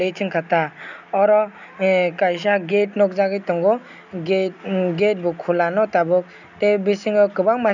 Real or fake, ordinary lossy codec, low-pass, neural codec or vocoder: real; none; 7.2 kHz; none